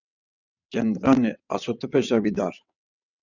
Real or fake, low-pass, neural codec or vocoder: fake; 7.2 kHz; vocoder, 22.05 kHz, 80 mel bands, WaveNeXt